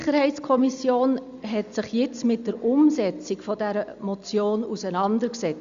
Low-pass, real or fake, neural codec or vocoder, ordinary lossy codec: 7.2 kHz; real; none; Opus, 64 kbps